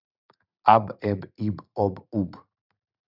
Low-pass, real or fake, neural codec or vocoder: 5.4 kHz; fake; vocoder, 44.1 kHz, 80 mel bands, Vocos